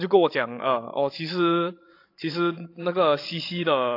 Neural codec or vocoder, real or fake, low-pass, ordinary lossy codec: codec, 16 kHz, 16 kbps, FreqCodec, larger model; fake; 5.4 kHz; AAC, 32 kbps